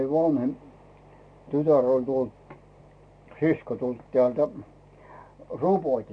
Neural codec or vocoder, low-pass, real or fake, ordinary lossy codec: none; 9.9 kHz; real; AAC, 48 kbps